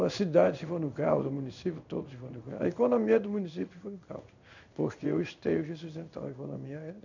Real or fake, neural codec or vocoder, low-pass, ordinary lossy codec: fake; codec, 16 kHz in and 24 kHz out, 1 kbps, XY-Tokenizer; 7.2 kHz; none